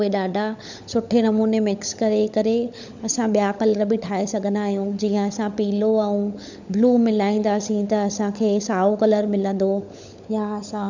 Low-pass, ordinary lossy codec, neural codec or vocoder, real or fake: 7.2 kHz; none; codec, 16 kHz, 16 kbps, FunCodec, trained on LibriTTS, 50 frames a second; fake